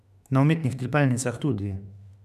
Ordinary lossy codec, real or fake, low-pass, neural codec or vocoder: none; fake; 14.4 kHz; autoencoder, 48 kHz, 32 numbers a frame, DAC-VAE, trained on Japanese speech